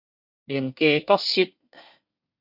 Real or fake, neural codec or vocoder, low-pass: fake; codec, 24 kHz, 1 kbps, SNAC; 5.4 kHz